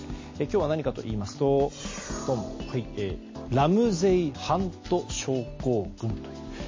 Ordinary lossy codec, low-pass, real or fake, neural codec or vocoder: MP3, 32 kbps; 7.2 kHz; real; none